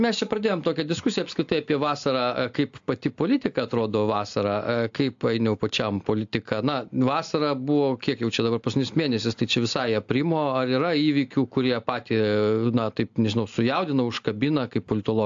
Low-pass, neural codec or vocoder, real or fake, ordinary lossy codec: 7.2 kHz; none; real; AAC, 64 kbps